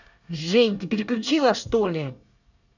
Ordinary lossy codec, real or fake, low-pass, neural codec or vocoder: none; fake; 7.2 kHz; codec, 24 kHz, 1 kbps, SNAC